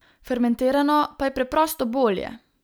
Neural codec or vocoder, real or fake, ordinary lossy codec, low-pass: none; real; none; none